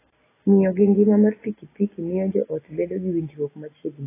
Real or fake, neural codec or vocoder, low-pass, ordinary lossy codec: real; none; 3.6 kHz; AAC, 16 kbps